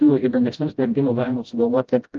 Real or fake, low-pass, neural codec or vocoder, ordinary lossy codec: fake; 7.2 kHz; codec, 16 kHz, 0.5 kbps, FreqCodec, smaller model; Opus, 24 kbps